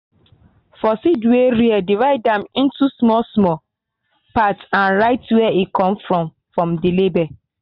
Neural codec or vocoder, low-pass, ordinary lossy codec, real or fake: none; 5.4 kHz; none; real